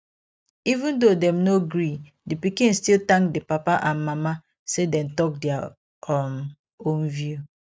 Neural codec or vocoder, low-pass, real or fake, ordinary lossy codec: none; none; real; none